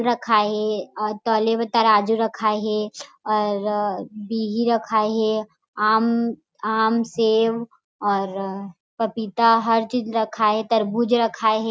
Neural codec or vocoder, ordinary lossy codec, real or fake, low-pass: none; none; real; none